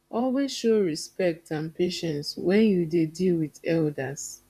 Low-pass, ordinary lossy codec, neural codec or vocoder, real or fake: 14.4 kHz; none; vocoder, 44.1 kHz, 128 mel bands, Pupu-Vocoder; fake